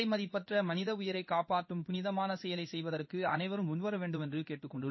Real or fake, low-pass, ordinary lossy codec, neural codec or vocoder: fake; 7.2 kHz; MP3, 24 kbps; codec, 16 kHz in and 24 kHz out, 1 kbps, XY-Tokenizer